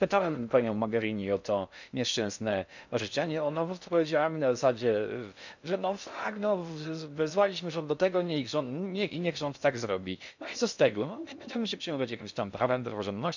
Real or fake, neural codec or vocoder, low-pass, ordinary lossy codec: fake; codec, 16 kHz in and 24 kHz out, 0.6 kbps, FocalCodec, streaming, 2048 codes; 7.2 kHz; none